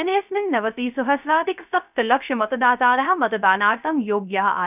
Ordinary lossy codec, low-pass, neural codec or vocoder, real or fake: none; 3.6 kHz; codec, 16 kHz, 0.3 kbps, FocalCodec; fake